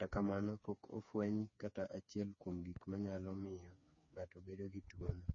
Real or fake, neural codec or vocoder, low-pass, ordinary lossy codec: fake; codec, 16 kHz, 4 kbps, FreqCodec, smaller model; 7.2 kHz; MP3, 32 kbps